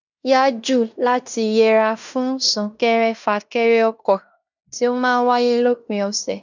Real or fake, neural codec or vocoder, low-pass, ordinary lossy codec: fake; codec, 16 kHz in and 24 kHz out, 0.9 kbps, LongCat-Audio-Codec, fine tuned four codebook decoder; 7.2 kHz; none